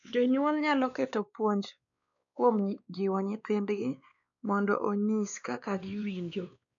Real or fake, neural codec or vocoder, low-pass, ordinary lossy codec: fake; codec, 16 kHz, 2 kbps, X-Codec, WavLM features, trained on Multilingual LibriSpeech; 7.2 kHz; none